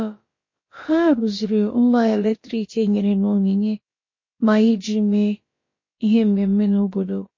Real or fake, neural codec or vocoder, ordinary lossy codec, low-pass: fake; codec, 16 kHz, about 1 kbps, DyCAST, with the encoder's durations; MP3, 32 kbps; 7.2 kHz